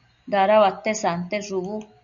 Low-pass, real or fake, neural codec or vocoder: 7.2 kHz; real; none